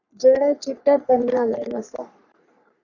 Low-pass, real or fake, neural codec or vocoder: 7.2 kHz; fake; codec, 44.1 kHz, 3.4 kbps, Pupu-Codec